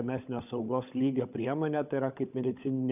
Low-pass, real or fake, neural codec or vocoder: 3.6 kHz; fake; codec, 16 kHz, 16 kbps, FunCodec, trained on LibriTTS, 50 frames a second